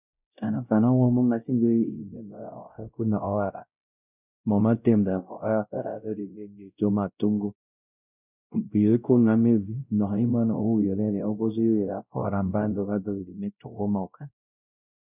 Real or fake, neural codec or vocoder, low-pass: fake; codec, 16 kHz, 0.5 kbps, X-Codec, WavLM features, trained on Multilingual LibriSpeech; 3.6 kHz